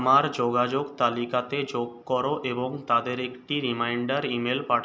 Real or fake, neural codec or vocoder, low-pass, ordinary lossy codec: real; none; none; none